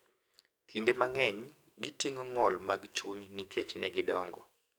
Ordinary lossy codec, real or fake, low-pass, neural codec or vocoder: none; fake; none; codec, 44.1 kHz, 2.6 kbps, SNAC